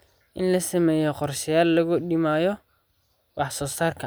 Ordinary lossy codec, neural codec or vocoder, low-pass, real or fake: none; none; none; real